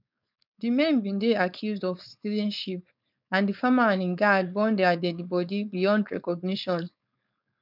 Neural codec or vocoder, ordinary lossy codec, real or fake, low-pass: codec, 16 kHz, 4.8 kbps, FACodec; none; fake; 5.4 kHz